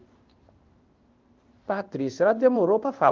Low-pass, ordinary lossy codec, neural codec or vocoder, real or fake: 7.2 kHz; Opus, 32 kbps; codec, 16 kHz in and 24 kHz out, 1 kbps, XY-Tokenizer; fake